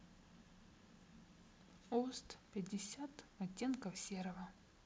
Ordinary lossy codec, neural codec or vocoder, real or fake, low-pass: none; none; real; none